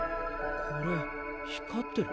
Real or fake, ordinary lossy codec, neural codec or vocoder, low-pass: real; none; none; none